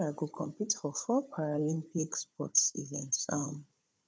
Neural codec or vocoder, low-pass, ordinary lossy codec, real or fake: codec, 16 kHz, 16 kbps, FunCodec, trained on Chinese and English, 50 frames a second; none; none; fake